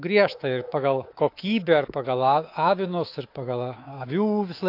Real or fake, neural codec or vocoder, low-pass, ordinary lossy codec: fake; codec, 24 kHz, 3.1 kbps, DualCodec; 5.4 kHz; AAC, 32 kbps